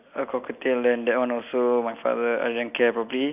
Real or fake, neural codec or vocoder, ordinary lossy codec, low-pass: real; none; none; 3.6 kHz